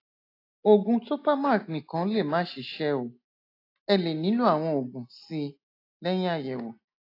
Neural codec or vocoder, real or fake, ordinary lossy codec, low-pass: none; real; AAC, 32 kbps; 5.4 kHz